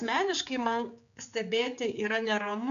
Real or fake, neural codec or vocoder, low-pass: fake; codec, 16 kHz, 4 kbps, X-Codec, HuBERT features, trained on general audio; 7.2 kHz